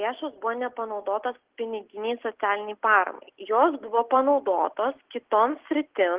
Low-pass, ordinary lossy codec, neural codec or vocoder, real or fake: 3.6 kHz; Opus, 16 kbps; none; real